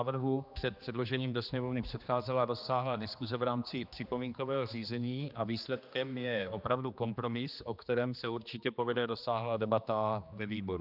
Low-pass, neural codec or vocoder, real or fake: 5.4 kHz; codec, 16 kHz, 2 kbps, X-Codec, HuBERT features, trained on general audio; fake